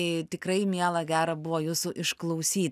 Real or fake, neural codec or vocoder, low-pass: real; none; 14.4 kHz